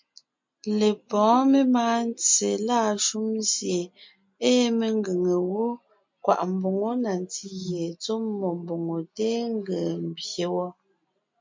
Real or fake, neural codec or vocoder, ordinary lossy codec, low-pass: real; none; MP3, 48 kbps; 7.2 kHz